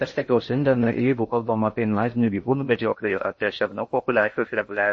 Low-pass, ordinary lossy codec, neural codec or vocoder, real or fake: 9.9 kHz; MP3, 32 kbps; codec, 16 kHz in and 24 kHz out, 0.6 kbps, FocalCodec, streaming, 2048 codes; fake